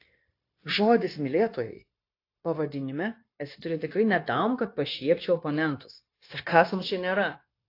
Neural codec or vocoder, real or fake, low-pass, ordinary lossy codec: codec, 16 kHz, 0.9 kbps, LongCat-Audio-Codec; fake; 5.4 kHz; AAC, 32 kbps